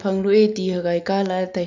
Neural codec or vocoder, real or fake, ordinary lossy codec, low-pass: none; real; none; 7.2 kHz